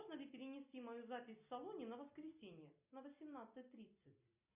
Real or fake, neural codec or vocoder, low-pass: real; none; 3.6 kHz